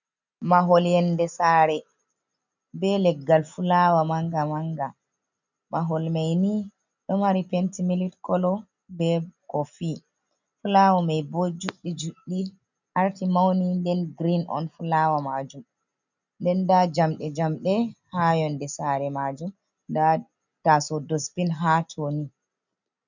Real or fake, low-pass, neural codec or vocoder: real; 7.2 kHz; none